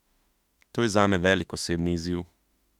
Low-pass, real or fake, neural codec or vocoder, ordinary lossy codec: 19.8 kHz; fake; autoencoder, 48 kHz, 32 numbers a frame, DAC-VAE, trained on Japanese speech; Opus, 64 kbps